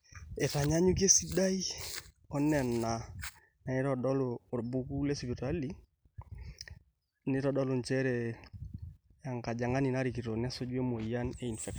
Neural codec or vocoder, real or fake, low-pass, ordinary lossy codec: none; real; none; none